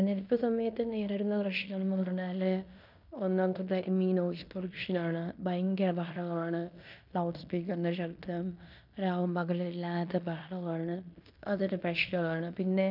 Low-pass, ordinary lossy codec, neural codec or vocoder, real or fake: 5.4 kHz; none; codec, 16 kHz in and 24 kHz out, 0.9 kbps, LongCat-Audio-Codec, fine tuned four codebook decoder; fake